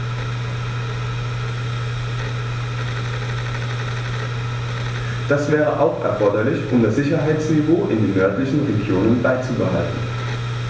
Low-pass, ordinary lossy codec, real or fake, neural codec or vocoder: none; none; real; none